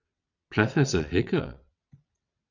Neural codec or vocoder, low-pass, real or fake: vocoder, 22.05 kHz, 80 mel bands, WaveNeXt; 7.2 kHz; fake